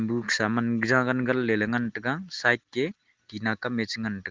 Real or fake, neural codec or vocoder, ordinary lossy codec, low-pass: real; none; Opus, 16 kbps; 7.2 kHz